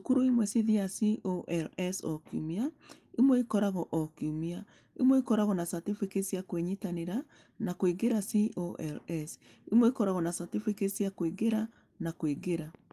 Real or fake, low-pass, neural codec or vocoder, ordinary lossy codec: real; 14.4 kHz; none; Opus, 32 kbps